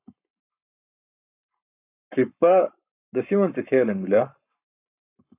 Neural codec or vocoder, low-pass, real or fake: codec, 44.1 kHz, 7.8 kbps, Pupu-Codec; 3.6 kHz; fake